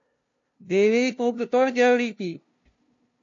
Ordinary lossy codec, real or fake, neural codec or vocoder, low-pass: AAC, 48 kbps; fake; codec, 16 kHz, 0.5 kbps, FunCodec, trained on LibriTTS, 25 frames a second; 7.2 kHz